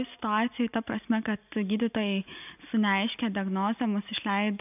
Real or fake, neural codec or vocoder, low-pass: real; none; 3.6 kHz